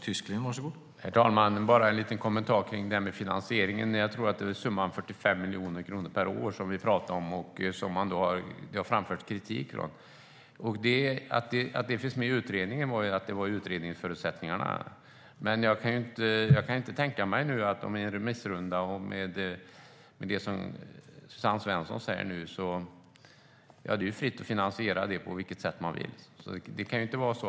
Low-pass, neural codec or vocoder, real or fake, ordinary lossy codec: none; none; real; none